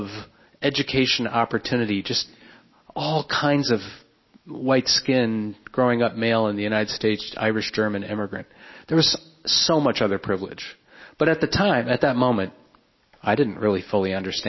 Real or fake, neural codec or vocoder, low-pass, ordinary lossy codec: real; none; 7.2 kHz; MP3, 24 kbps